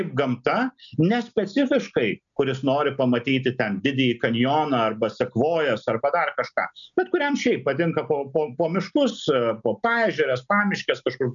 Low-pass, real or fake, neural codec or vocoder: 7.2 kHz; real; none